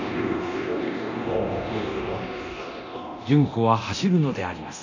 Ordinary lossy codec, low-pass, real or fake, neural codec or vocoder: none; 7.2 kHz; fake; codec, 24 kHz, 0.9 kbps, DualCodec